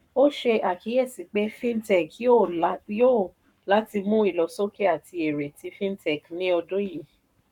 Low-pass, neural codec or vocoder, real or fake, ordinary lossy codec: 19.8 kHz; codec, 44.1 kHz, 7.8 kbps, Pupu-Codec; fake; none